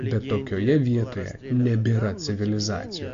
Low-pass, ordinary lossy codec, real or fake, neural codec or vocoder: 7.2 kHz; AAC, 48 kbps; real; none